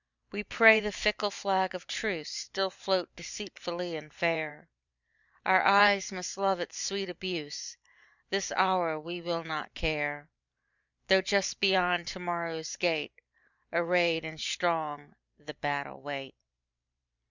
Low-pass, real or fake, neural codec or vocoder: 7.2 kHz; fake; vocoder, 44.1 kHz, 128 mel bands every 512 samples, BigVGAN v2